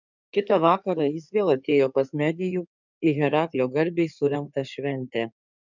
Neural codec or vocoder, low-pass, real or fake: codec, 16 kHz in and 24 kHz out, 2.2 kbps, FireRedTTS-2 codec; 7.2 kHz; fake